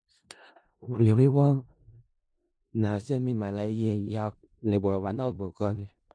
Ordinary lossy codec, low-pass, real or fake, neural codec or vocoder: MP3, 64 kbps; 9.9 kHz; fake; codec, 16 kHz in and 24 kHz out, 0.4 kbps, LongCat-Audio-Codec, four codebook decoder